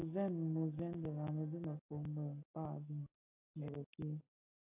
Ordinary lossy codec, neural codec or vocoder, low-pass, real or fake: AAC, 32 kbps; none; 3.6 kHz; real